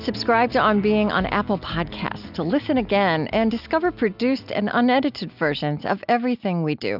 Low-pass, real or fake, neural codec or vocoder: 5.4 kHz; real; none